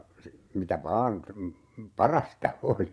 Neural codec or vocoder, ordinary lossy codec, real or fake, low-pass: vocoder, 22.05 kHz, 80 mel bands, Vocos; none; fake; none